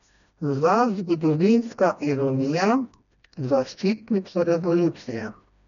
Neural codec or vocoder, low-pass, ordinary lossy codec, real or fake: codec, 16 kHz, 1 kbps, FreqCodec, smaller model; 7.2 kHz; none; fake